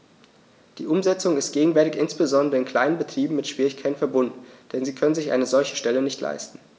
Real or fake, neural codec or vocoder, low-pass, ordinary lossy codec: real; none; none; none